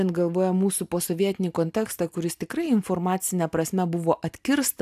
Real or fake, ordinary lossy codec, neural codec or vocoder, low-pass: real; AAC, 96 kbps; none; 14.4 kHz